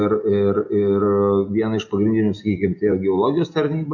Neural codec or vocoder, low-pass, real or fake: none; 7.2 kHz; real